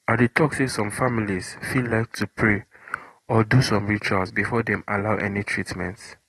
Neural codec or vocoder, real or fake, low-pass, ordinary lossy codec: none; real; 19.8 kHz; AAC, 32 kbps